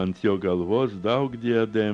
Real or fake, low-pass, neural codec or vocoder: real; 9.9 kHz; none